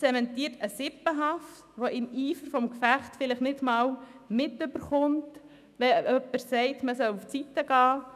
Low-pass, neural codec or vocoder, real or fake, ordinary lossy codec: 14.4 kHz; autoencoder, 48 kHz, 128 numbers a frame, DAC-VAE, trained on Japanese speech; fake; none